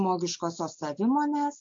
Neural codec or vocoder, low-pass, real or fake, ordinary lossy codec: none; 7.2 kHz; real; MP3, 48 kbps